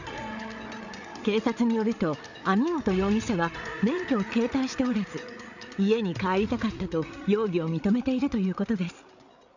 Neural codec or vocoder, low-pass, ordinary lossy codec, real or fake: codec, 16 kHz, 8 kbps, FreqCodec, larger model; 7.2 kHz; none; fake